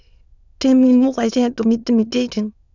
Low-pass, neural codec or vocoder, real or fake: 7.2 kHz; autoencoder, 22.05 kHz, a latent of 192 numbers a frame, VITS, trained on many speakers; fake